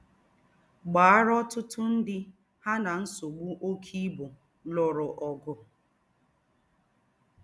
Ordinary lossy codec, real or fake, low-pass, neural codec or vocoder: none; real; none; none